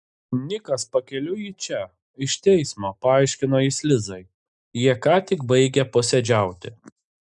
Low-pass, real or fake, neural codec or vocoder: 10.8 kHz; real; none